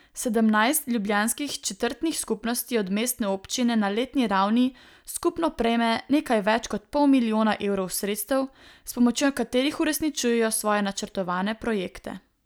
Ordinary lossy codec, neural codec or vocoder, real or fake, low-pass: none; none; real; none